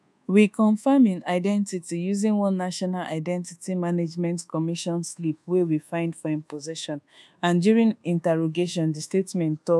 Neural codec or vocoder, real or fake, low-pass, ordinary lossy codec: codec, 24 kHz, 1.2 kbps, DualCodec; fake; none; none